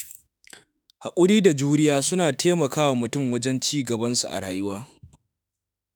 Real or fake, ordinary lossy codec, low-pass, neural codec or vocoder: fake; none; none; autoencoder, 48 kHz, 32 numbers a frame, DAC-VAE, trained on Japanese speech